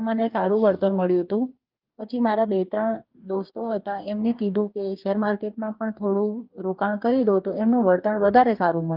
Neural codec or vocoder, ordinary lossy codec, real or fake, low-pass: codec, 44.1 kHz, 2.6 kbps, DAC; Opus, 24 kbps; fake; 5.4 kHz